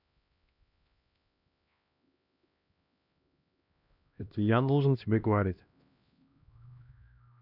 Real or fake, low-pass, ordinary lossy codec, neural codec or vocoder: fake; 5.4 kHz; none; codec, 16 kHz, 1 kbps, X-Codec, HuBERT features, trained on LibriSpeech